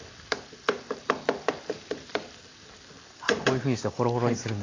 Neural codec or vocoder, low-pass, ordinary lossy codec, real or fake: none; 7.2 kHz; AAC, 32 kbps; real